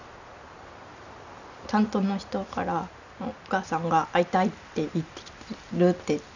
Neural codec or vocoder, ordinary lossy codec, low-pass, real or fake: none; none; 7.2 kHz; real